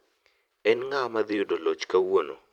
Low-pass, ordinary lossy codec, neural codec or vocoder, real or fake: 19.8 kHz; none; vocoder, 44.1 kHz, 128 mel bands every 512 samples, BigVGAN v2; fake